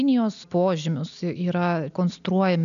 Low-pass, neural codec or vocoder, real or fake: 7.2 kHz; none; real